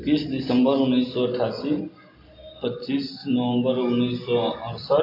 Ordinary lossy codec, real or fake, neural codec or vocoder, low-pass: AAC, 32 kbps; real; none; 5.4 kHz